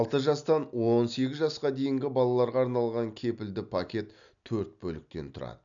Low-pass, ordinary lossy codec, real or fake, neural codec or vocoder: 7.2 kHz; none; real; none